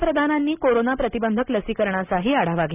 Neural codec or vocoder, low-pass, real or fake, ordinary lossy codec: none; 3.6 kHz; real; none